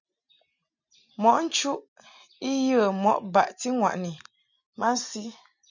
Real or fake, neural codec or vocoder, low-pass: real; none; 7.2 kHz